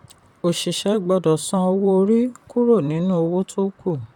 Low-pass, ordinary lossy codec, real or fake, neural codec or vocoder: 19.8 kHz; none; fake; vocoder, 44.1 kHz, 128 mel bands, Pupu-Vocoder